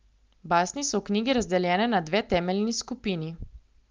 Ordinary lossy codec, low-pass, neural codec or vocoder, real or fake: Opus, 32 kbps; 7.2 kHz; none; real